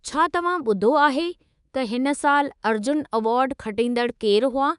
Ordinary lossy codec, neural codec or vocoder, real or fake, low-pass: none; codec, 24 kHz, 3.1 kbps, DualCodec; fake; 10.8 kHz